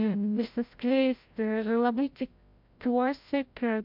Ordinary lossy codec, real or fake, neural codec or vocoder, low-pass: MP3, 48 kbps; fake; codec, 16 kHz, 0.5 kbps, FreqCodec, larger model; 5.4 kHz